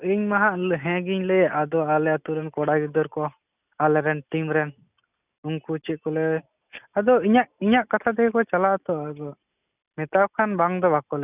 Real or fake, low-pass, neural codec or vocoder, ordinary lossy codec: real; 3.6 kHz; none; none